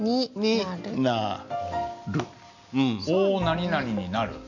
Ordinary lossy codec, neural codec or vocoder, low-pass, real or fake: none; none; 7.2 kHz; real